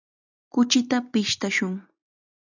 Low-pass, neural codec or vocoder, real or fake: 7.2 kHz; none; real